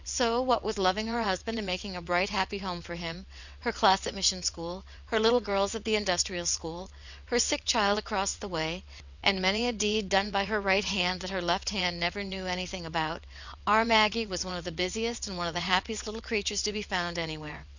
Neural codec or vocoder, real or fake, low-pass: vocoder, 22.05 kHz, 80 mel bands, WaveNeXt; fake; 7.2 kHz